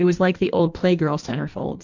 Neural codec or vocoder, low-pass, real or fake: codec, 16 kHz in and 24 kHz out, 1.1 kbps, FireRedTTS-2 codec; 7.2 kHz; fake